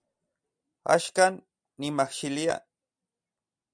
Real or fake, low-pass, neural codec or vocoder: real; 9.9 kHz; none